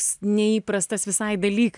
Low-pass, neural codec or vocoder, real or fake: 10.8 kHz; none; real